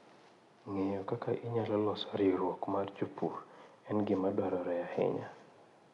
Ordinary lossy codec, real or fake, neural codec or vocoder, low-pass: none; real; none; 10.8 kHz